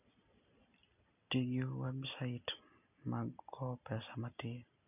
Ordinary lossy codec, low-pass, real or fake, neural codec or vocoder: none; 3.6 kHz; real; none